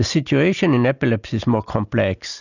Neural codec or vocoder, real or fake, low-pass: none; real; 7.2 kHz